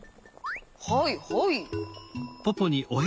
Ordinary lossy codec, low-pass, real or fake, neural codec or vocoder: none; none; real; none